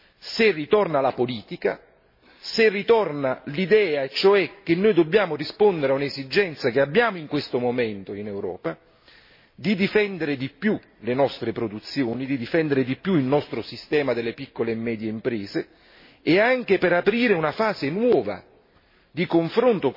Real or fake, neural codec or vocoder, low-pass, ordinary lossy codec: real; none; 5.4 kHz; MP3, 24 kbps